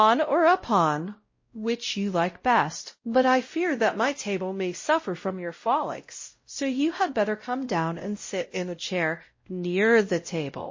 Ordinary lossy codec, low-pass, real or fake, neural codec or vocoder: MP3, 32 kbps; 7.2 kHz; fake; codec, 16 kHz, 0.5 kbps, X-Codec, WavLM features, trained on Multilingual LibriSpeech